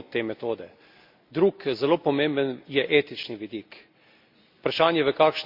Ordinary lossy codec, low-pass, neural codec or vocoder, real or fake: MP3, 48 kbps; 5.4 kHz; none; real